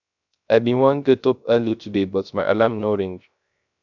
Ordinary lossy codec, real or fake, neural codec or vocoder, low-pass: none; fake; codec, 16 kHz, 0.3 kbps, FocalCodec; 7.2 kHz